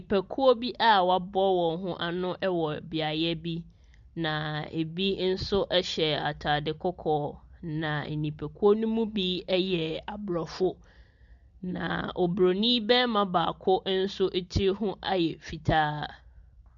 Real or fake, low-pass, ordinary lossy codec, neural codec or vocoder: real; 7.2 kHz; MP3, 96 kbps; none